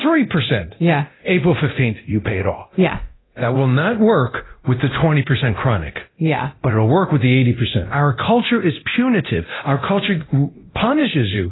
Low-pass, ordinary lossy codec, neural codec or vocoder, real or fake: 7.2 kHz; AAC, 16 kbps; codec, 24 kHz, 0.9 kbps, DualCodec; fake